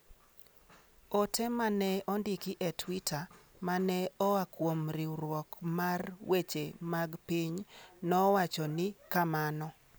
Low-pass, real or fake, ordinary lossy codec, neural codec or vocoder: none; real; none; none